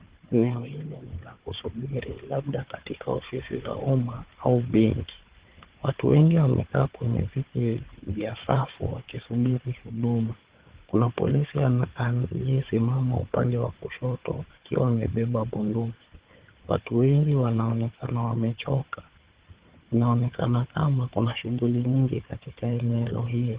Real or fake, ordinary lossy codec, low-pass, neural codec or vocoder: fake; Opus, 16 kbps; 3.6 kHz; codec, 16 kHz, 4 kbps, FunCodec, trained on Chinese and English, 50 frames a second